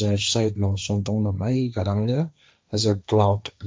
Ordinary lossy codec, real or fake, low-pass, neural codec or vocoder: none; fake; none; codec, 16 kHz, 1.1 kbps, Voila-Tokenizer